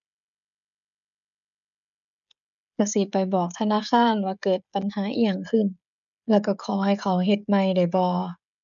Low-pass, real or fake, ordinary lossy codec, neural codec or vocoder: 7.2 kHz; fake; none; codec, 16 kHz, 16 kbps, FreqCodec, smaller model